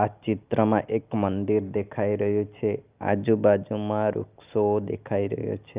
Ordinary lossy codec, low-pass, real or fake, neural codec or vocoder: Opus, 24 kbps; 3.6 kHz; real; none